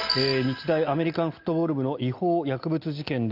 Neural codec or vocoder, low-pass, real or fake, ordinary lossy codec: none; 5.4 kHz; real; Opus, 32 kbps